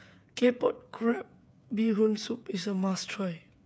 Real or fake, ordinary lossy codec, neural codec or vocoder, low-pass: fake; none; codec, 16 kHz, 8 kbps, FreqCodec, smaller model; none